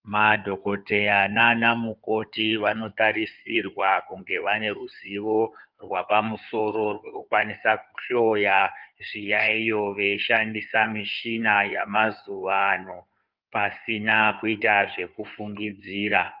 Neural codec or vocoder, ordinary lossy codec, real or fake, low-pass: codec, 16 kHz in and 24 kHz out, 2.2 kbps, FireRedTTS-2 codec; Opus, 24 kbps; fake; 5.4 kHz